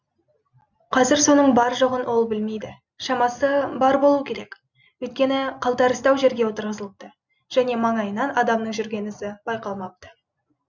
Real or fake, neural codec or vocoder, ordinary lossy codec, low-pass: real; none; none; 7.2 kHz